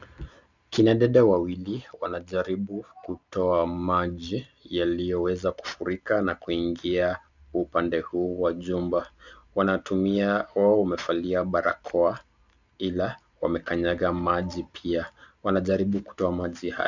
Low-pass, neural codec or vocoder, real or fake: 7.2 kHz; none; real